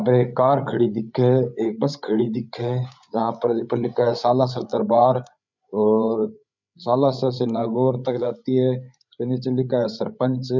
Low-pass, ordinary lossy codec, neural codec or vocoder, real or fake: 7.2 kHz; none; codec, 16 kHz, 8 kbps, FreqCodec, larger model; fake